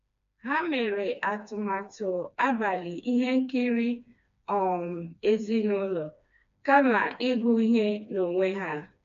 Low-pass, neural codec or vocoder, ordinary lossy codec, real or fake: 7.2 kHz; codec, 16 kHz, 2 kbps, FreqCodec, smaller model; MP3, 64 kbps; fake